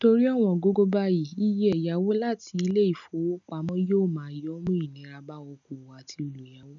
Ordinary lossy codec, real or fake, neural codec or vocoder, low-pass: none; real; none; 7.2 kHz